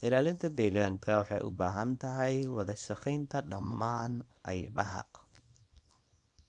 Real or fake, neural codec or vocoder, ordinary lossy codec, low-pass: fake; codec, 24 kHz, 0.9 kbps, WavTokenizer, small release; none; 10.8 kHz